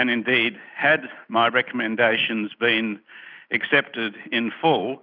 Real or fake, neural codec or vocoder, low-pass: real; none; 5.4 kHz